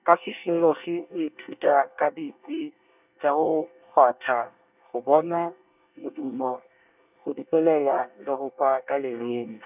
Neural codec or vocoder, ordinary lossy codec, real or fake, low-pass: codec, 24 kHz, 1 kbps, SNAC; none; fake; 3.6 kHz